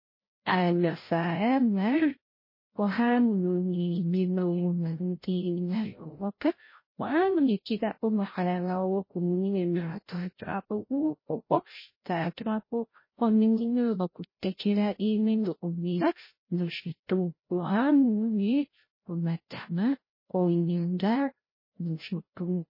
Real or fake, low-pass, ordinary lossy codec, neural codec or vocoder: fake; 5.4 kHz; MP3, 24 kbps; codec, 16 kHz, 0.5 kbps, FreqCodec, larger model